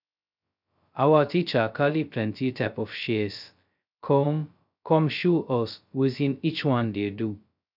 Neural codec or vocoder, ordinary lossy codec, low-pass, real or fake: codec, 16 kHz, 0.2 kbps, FocalCodec; none; 5.4 kHz; fake